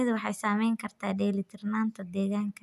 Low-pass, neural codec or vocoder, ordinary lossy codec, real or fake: 14.4 kHz; none; none; real